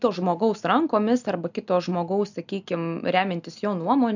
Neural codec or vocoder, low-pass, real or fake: none; 7.2 kHz; real